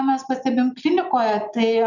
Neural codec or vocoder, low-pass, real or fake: none; 7.2 kHz; real